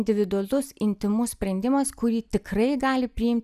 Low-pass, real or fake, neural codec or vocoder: 14.4 kHz; real; none